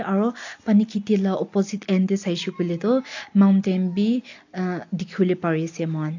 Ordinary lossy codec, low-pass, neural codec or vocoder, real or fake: AAC, 48 kbps; 7.2 kHz; none; real